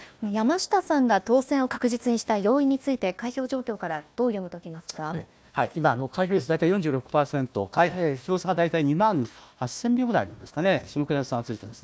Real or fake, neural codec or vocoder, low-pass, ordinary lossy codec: fake; codec, 16 kHz, 1 kbps, FunCodec, trained on Chinese and English, 50 frames a second; none; none